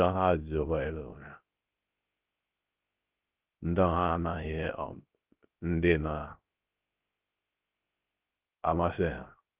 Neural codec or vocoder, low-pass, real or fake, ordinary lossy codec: codec, 16 kHz, 0.3 kbps, FocalCodec; 3.6 kHz; fake; Opus, 32 kbps